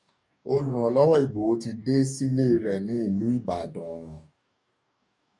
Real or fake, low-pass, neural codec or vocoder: fake; 10.8 kHz; codec, 44.1 kHz, 2.6 kbps, DAC